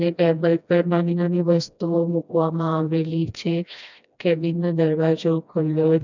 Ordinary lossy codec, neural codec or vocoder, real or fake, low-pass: none; codec, 16 kHz, 1 kbps, FreqCodec, smaller model; fake; 7.2 kHz